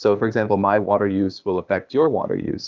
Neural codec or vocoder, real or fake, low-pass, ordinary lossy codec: codec, 16 kHz, 0.8 kbps, ZipCodec; fake; 7.2 kHz; Opus, 24 kbps